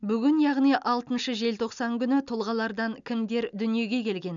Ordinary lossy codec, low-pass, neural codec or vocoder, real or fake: none; 7.2 kHz; none; real